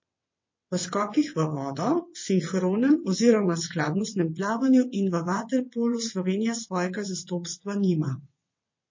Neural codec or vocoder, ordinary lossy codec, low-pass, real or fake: vocoder, 22.05 kHz, 80 mel bands, WaveNeXt; MP3, 32 kbps; 7.2 kHz; fake